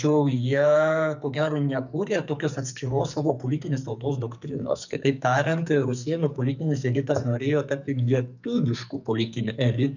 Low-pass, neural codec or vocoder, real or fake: 7.2 kHz; codec, 44.1 kHz, 2.6 kbps, SNAC; fake